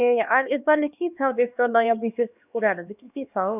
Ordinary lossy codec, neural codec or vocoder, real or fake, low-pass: none; codec, 16 kHz, 2 kbps, X-Codec, HuBERT features, trained on LibriSpeech; fake; 3.6 kHz